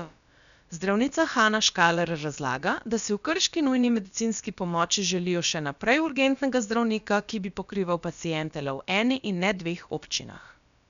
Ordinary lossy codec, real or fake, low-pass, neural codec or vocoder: none; fake; 7.2 kHz; codec, 16 kHz, about 1 kbps, DyCAST, with the encoder's durations